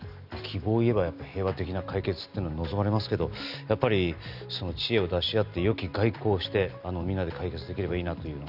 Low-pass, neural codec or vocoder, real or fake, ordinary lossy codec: 5.4 kHz; none; real; none